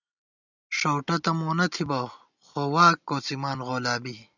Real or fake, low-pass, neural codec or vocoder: real; 7.2 kHz; none